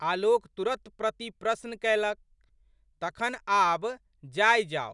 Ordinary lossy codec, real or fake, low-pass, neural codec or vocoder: none; real; 10.8 kHz; none